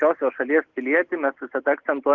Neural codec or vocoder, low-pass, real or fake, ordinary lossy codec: none; 7.2 kHz; real; Opus, 16 kbps